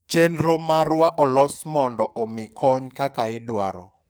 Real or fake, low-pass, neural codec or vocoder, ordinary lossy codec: fake; none; codec, 44.1 kHz, 2.6 kbps, SNAC; none